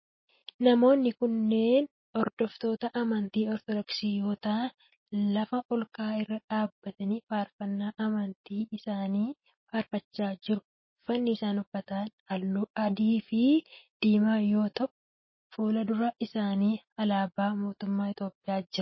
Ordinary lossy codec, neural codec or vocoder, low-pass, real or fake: MP3, 24 kbps; none; 7.2 kHz; real